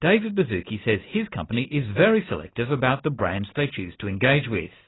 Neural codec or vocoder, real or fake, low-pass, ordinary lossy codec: none; real; 7.2 kHz; AAC, 16 kbps